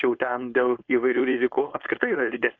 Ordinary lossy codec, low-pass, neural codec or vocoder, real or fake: AAC, 48 kbps; 7.2 kHz; codec, 16 kHz, 4.8 kbps, FACodec; fake